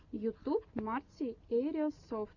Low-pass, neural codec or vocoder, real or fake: 7.2 kHz; none; real